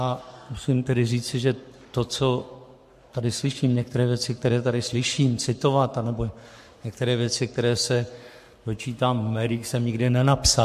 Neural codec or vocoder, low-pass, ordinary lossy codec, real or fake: codec, 44.1 kHz, 7.8 kbps, Pupu-Codec; 14.4 kHz; MP3, 64 kbps; fake